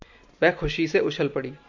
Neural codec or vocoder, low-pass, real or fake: none; 7.2 kHz; real